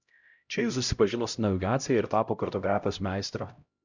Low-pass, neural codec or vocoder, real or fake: 7.2 kHz; codec, 16 kHz, 0.5 kbps, X-Codec, HuBERT features, trained on LibriSpeech; fake